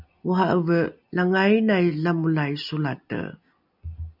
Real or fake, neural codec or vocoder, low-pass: real; none; 5.4 kHz